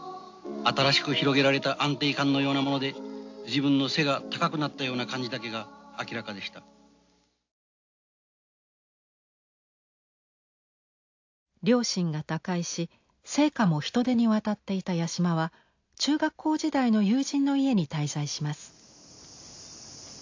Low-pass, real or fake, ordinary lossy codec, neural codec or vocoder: 7.2 kHz; real; AAC, 48 kbps; none